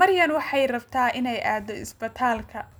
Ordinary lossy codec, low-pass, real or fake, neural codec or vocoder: none; none; real; none